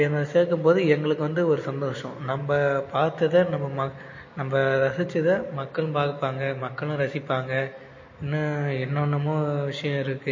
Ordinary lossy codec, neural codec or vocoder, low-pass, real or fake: MP3, 32 kbps; none; 7.2 kHz; real